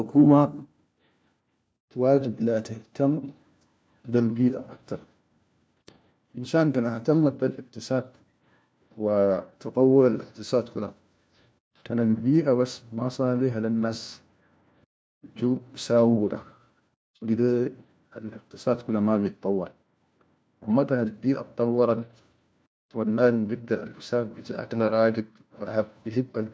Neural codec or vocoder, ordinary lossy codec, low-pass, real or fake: codec, 16 kHz, 1 kbps, FunCodec, trained on LibriTTS, 50 frames a second; none; none; fake